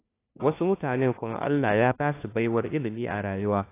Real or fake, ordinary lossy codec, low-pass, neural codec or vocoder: fake; AAC, 24 kbps; 3.6 kHz; codec, 16 kHz, 1 kbps, FunCodec, trained on LibriTTS, 50 frames a second